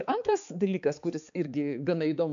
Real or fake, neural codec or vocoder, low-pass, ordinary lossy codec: fake; codec, 16 kHz, 4 kbps, X-Codec, HuBERT features, trained on balanced general audio; 7.2 kHz; MP3, 64 kbps